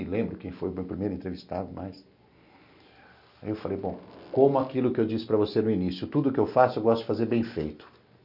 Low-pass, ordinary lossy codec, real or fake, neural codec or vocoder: 5.4 kHz; none; real; none